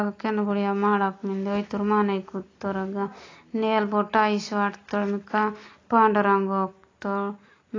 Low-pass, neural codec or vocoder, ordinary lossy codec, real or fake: 7.2 kHz; none; AAC, 32 kbps; real